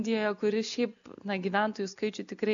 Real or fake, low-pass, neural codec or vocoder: real; 7.2 kHz; none